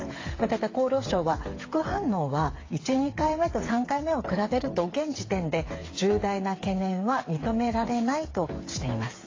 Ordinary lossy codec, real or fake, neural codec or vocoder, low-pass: AAC, 32 kbps; fake; codec, 16 kHz, 8 kbps, FreqCodec, smaller model; 7.2 kHz